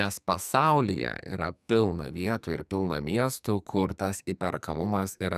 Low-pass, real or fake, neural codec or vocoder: 14.4 kHz; fake; codec, 44.1 kHz, 2.6 kbps, SNAC